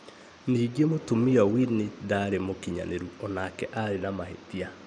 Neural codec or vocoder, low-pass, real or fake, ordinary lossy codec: none; 9.9 kHz; real; none